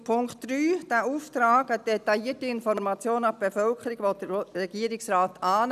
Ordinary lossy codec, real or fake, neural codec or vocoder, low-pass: none; real; none; 14.4 kHz